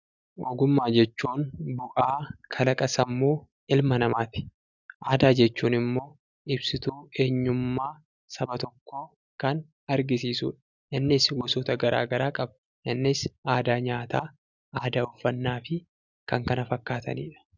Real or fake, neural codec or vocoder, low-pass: real; none; 7.2 kHz